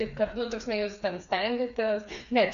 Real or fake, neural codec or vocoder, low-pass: fake; codec, 16 kHz, 4 kbps, FreqCodec, smaller model; 7.2 kHz